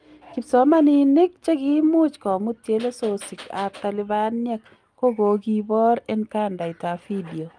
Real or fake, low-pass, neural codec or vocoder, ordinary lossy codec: real; 9.9 kHz; none; Opus, 32 kbps